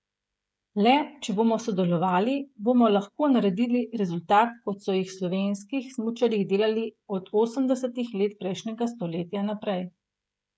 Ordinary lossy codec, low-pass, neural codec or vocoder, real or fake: none; none; codec, 16 kHz, 16 kbps, FreqCodec, smaller model; fake